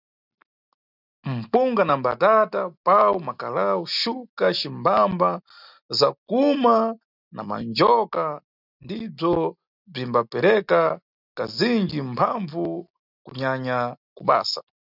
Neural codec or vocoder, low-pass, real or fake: none; 5.4 kHz; real